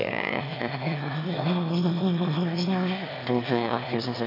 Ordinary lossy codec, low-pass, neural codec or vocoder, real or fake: MP3, 48 kbps; 5.4 kHz; autoencoder, 22.05 kHz, a latent of 192 numbers a frame, VITS, trained on one speaker; fake